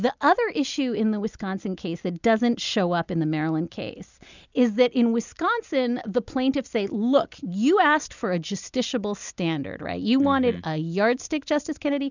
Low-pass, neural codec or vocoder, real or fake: 7.2 kHz; none; real